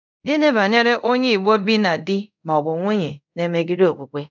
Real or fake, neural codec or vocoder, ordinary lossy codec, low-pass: fake; codec, 24 kHz, 0.5 kbps, DualCodec; none; 7.2 kHz